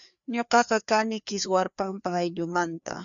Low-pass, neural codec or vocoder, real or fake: 7.2 kHz; codec, 16 kHz, 2 kbps, FreqCodec, larger model; fake